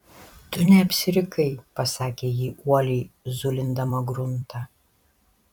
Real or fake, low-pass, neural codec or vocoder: fake; 19.8 kHz; vocoder, 44.1 kHz, 128 mel bands every 256 samples, BigVGAN v2